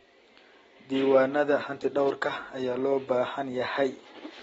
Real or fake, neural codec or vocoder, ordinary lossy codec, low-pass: real; none; AAC, 24 kbps; 19.8 kHz